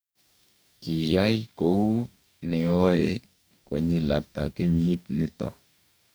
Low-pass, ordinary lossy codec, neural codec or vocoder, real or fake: none; none; codec, 44.1 kHz, 2.6 kbps, DAC; fake